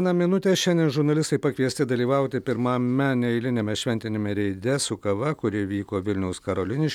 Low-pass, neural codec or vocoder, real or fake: 19.8 kHz; none; real